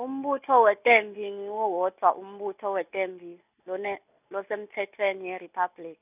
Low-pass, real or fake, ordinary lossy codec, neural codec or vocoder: 3.6 kHz; real; none; none